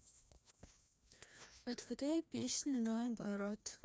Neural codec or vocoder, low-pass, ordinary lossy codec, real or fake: codec, 16 kHz, 1 kbps, FreqCodec, larger model; none; none; fake